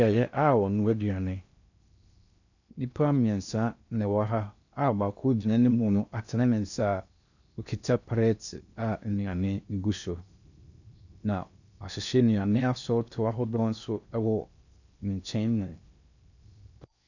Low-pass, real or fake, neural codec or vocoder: 7.2 kHz; fake; codec, 16 kHz in and 24 kHz out, 0.6 kbps, FocalCodec, streaming, 4096 codes